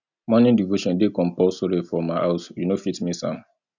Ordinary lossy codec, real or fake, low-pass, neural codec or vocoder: none; real; 7.2 kHz; none